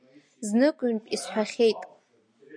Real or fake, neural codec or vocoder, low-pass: real; none; 9.9 kHz